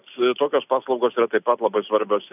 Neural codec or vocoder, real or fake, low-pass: none; real; 3.6 kHz